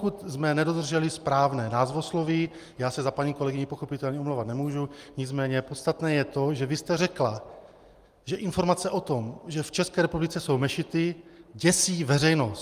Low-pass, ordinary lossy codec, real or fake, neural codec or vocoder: 14.4 kHz; Opus, 32 kbps; real; none